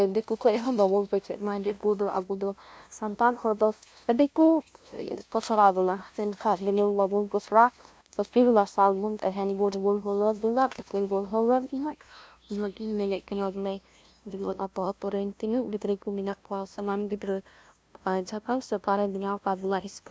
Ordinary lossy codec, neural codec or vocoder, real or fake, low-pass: none; codec, 16 kHz, 0.5 kbps, FunCodec, trained on LibriTTS, 25 frames a second; fake; none